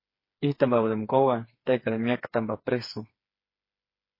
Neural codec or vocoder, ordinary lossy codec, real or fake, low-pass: codec, 16 kHz, 4 kbps, FreqCodec, smaller model; MP3, 24 kbps; fake; 5.4 kHz